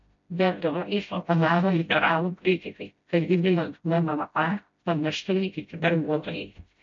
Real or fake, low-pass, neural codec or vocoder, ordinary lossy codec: fake; 7.2 kHz; codec, 16 kHz, 0.5 kbps, FreqCodec, smaller model; MP3, 48 kbps